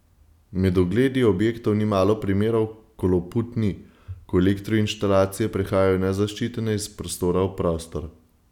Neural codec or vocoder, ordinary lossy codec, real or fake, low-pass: none; none; real; 19.8 kHz